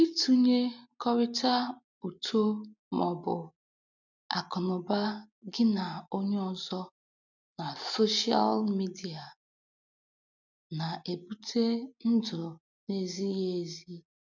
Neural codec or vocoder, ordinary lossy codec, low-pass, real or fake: none; none; 7.2 kHz; real